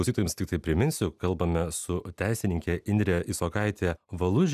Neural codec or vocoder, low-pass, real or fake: none; 14.4 kHz; real